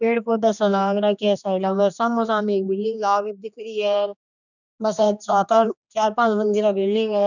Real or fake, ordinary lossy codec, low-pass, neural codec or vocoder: fake; none; 7.2 kHz; codec, 16 kHz, 2 kbps, X-Codec, HuBERT features, trained on general audio